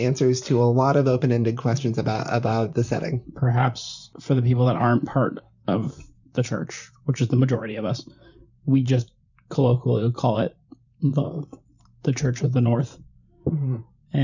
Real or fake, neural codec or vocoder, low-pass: fake; vocoder, 44.1 kHz, 128 mel bands, Pupu-Vocoder; 7.2 kHz